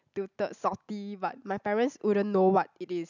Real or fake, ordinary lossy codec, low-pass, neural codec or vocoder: real; none; 7.2 kHz; none